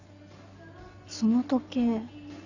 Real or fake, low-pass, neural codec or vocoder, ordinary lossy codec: real; 7.2 kHz; none; none